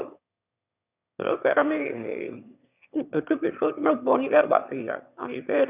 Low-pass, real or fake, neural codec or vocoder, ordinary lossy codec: 3.6 kHz; fake; autoencoder, 22.05 kHz, a latent of 192 numbers a frame, VITS, trained on one speaker; none